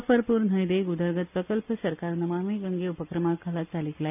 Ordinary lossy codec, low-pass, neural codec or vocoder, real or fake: none; 3.6 kHz; none; real